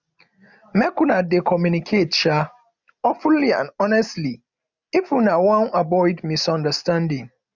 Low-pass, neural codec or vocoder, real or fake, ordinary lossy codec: 7.2 kHz; none; real; none